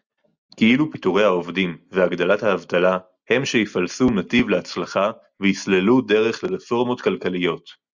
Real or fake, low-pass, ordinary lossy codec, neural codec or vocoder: real; 7.2 kHz; Opus, 64 kbps; none